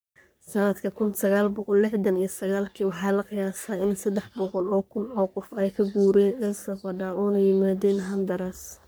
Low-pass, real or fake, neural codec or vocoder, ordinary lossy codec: none; fake; codec, 44.1 kHz, 3.4 kbps, Pupu-Codec; none